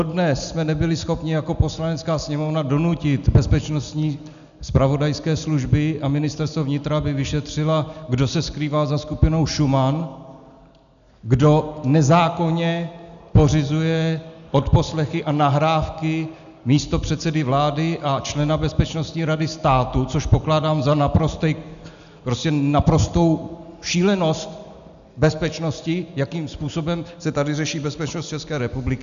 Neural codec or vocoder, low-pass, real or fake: none; 7.2 kHz; real